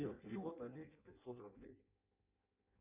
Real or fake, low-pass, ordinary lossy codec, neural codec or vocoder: fake; 3.6 kHz; AAC, 32 kbps; codec, 16 kHz in and 24 kHz out, 0.6 kbps, FireRedTTS-2 codec